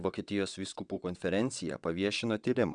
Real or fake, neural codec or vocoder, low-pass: fake; vocoder, 22.05 kHz, 80 mel bands, WaveNeXt; 9.9 kHz